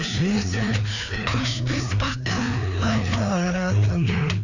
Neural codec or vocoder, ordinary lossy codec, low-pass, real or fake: codec, 16 kHz, 2 kbps, FreqCodec, larger model; none; 7.2 kHz; fake